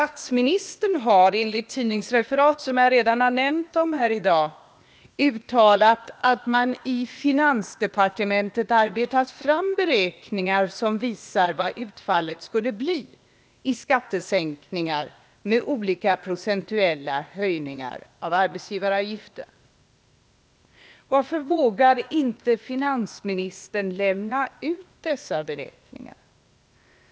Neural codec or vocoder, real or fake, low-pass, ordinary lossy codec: codec, 16 kHz, 0.8 kbps, ZipCodec; fake; none; none